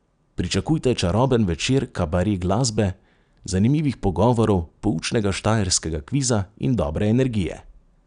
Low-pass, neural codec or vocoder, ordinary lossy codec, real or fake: 9.9 kHz; none; none; real